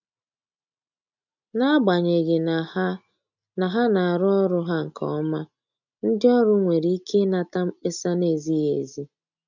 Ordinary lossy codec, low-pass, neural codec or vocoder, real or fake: none; 7.2 kHz; none; real